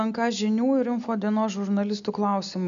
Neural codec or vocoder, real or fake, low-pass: none; real; 7.2 kHz